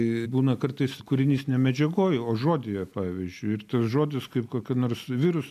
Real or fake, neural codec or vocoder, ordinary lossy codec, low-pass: real; none; MP3, 96 kbps; 14.4 kHz